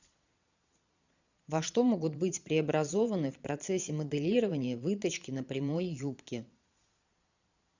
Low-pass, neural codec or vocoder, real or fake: 7.2 kHz; vocoder, 22.05 kHz, 80 mel bands, Vocos; fake